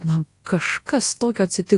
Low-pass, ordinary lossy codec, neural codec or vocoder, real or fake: 10.8 kHz; AAC, 48 kbps; codec, 24 kHz, 0.9 kbps, WavTokenizer, large speech release; fake